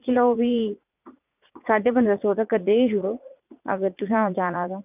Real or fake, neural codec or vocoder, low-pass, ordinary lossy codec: fake; vocoder, 44.1 kHz, 80 mel bands, Vocos; 3.6 kHz; none